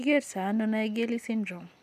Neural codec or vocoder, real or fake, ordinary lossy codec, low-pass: none; real; none; 14.4 kHz